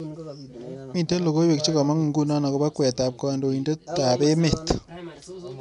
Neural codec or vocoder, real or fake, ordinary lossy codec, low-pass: none; real; none; 10.8 kHz